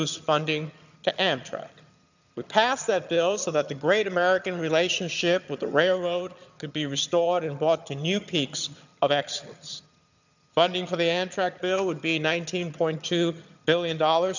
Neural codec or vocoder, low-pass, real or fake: vocoder, 22.05 kHz, 80 mel bands, HiFi-GAN; 7.2 kHz; fake